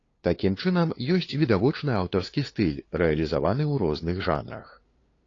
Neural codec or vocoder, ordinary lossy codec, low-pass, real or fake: codec, 16 kHz, 2 kbps, FunCodec, trained on LibriTTS, 25 frames a second; AAC, 32 kbps; 7.2 kHz; fake